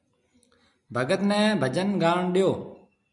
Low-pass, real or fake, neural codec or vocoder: 10.8 kHz; real; none